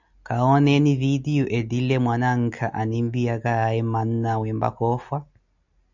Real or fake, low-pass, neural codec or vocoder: real; 7.2 kHz; none